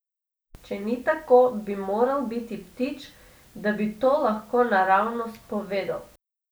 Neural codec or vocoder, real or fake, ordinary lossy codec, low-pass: none; real; none; none